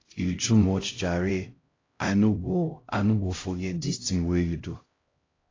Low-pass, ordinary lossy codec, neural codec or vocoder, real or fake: 7.2 kHz; AAC, 32 kbps; codec, 16 kHz, 0.5 kbps, X-Codec, HuBERT features, trained on LibriSpeech; fake